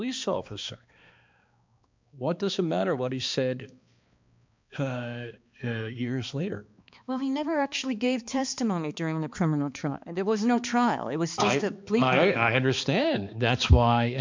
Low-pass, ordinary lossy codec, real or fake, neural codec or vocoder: 7.2 kHz; MP3, 64 kbps; fake; codec, 16 kHz, 2 kbps, X-Codec, HuBERT features, trained on balanced general audio